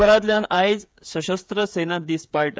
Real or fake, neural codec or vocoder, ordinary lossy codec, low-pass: fake; codec, 16 kHz, 8 kbps, FreqCodec, smaller model; none; none